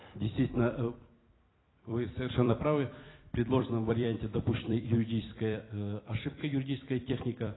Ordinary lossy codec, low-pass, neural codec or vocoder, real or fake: AAC, 16 kbps; 7.2 kHz; vocoder, 44.1 kHz, 128 mel bands every 256 samples, BigVGAN v2; fake